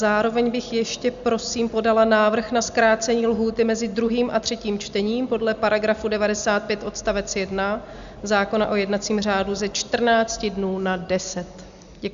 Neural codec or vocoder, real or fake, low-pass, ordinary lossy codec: none; real; 7.2 kHz; Opus, 64 kbps